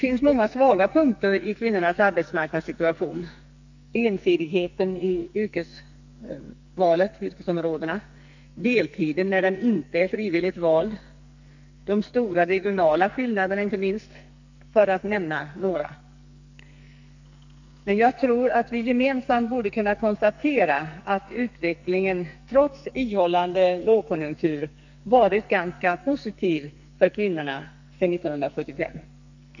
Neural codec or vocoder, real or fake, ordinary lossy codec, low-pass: codec, 44.1 kHz, 2.6 kbps, SNAC; fake; none; 7.2 kHz